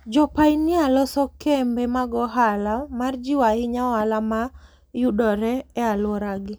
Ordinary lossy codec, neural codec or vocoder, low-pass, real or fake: none; none; none; real